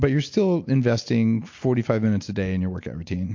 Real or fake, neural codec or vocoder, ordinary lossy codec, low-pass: real; none; MP3, 48 kbps; 7.2 kHz